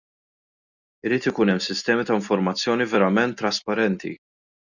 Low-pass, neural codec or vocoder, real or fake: 7.2 kHz; none; real